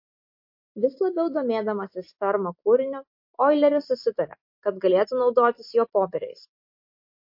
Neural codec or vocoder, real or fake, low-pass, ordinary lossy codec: none; real; 5.4 kHz; MP3, 32 kbps